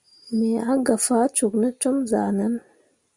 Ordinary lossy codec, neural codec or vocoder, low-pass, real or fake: Opus, 64 kbps; none; 10.8 kHz; real